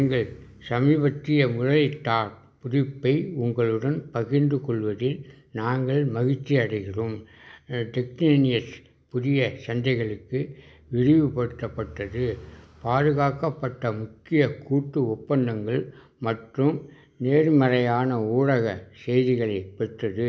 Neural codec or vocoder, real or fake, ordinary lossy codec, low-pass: none; real; none; none